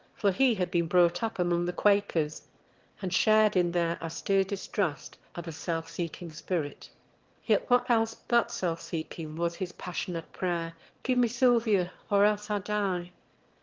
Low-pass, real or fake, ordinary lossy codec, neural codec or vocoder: 7.2 kHz; fake; Opus, 16 kbps; autoencoder, 22.05 kHz, a latent of 192 numbers a frame, VITS, trained on one speaker